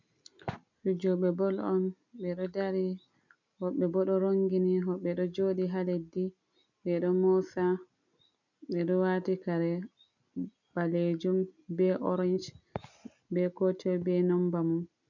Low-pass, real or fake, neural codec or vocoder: 7.2 kHz; real; none